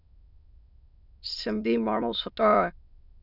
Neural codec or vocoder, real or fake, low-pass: autoencoder, 22.05 kHz, a latent of 192 numbers a frame, VITS, trained on many speakers; fake; 5.4 kHz